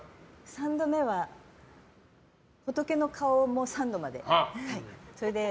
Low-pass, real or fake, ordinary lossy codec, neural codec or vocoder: none; real; none; none